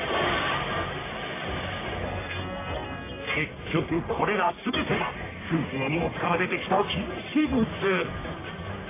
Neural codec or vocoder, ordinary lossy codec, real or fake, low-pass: codec, 44.1 kHz, 1.7 kbps, Pupu-Codec; AAC, 16 kbps; fake; 3.6 kHz